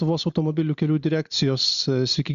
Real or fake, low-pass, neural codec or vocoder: real; 7.2 kHz; none